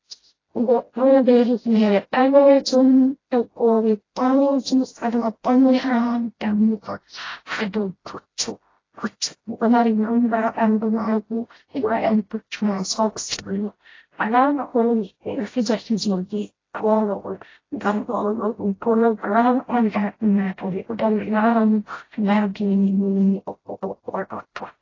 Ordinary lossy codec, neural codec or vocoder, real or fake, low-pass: AAC, 32 kbps; codec, 16 kHz, 0.5 kbps, FreqCodec, smaller model; fake; 7.2 kHz